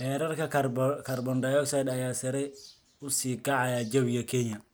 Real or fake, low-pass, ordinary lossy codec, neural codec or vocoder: real; none; none; none